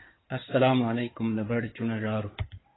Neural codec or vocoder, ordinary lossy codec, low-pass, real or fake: codec, 16 kHz in and 24 kHz out, 2.2 kbps, FireRedTTS-2 codec; AAC, 16 kbps; 7.2 kHz; fake